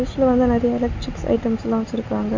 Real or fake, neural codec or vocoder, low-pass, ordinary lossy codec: real; none; 7.2 kHz; MP3, 64 kbps